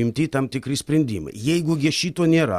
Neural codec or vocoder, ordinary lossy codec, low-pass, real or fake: none; Opus, 64 kbps; 14.4 kHz; real